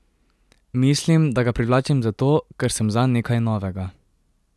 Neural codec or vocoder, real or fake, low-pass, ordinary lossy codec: none; real; none; none